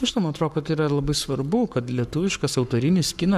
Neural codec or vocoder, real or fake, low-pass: codec, 44.1 kHz, 7.8 kbps, Pupu-Codec; fake; 14.4 kHz